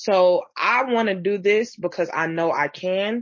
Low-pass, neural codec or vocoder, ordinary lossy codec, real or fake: 7.2 kHz; none; MP3, 32 kbps; real